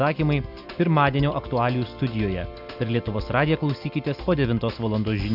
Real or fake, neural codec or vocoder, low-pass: real; none; 5.4 kHz